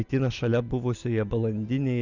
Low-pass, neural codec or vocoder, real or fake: 7.2 kHz; none; real